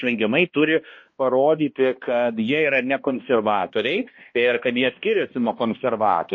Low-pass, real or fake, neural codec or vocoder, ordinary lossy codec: 7.2 kHz; fake; codec, 16 kHz, 1 kbps, X-Codec, HuBERT features, trained on balanced general audio; MP3, 32 kbps